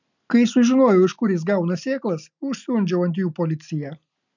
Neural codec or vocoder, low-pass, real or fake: none; 7.2 kHz; real